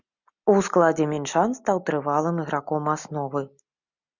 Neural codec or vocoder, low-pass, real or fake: none; 7.2 kHz; real